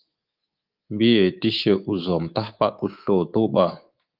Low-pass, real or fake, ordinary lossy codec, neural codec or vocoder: 5.4 kHz; fake; Opus, 32 kbps; codec, 24 kHz, 3.1 kbps, DualCodec